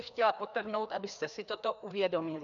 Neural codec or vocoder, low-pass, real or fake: codec, 16 kHz, 2 kbps, FreqCodec, larger model; 7.2 kHz; fake